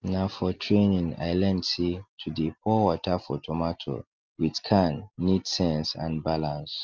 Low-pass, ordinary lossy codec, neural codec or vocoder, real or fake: 7.2 kHz; Opus, 24 kbps; none; real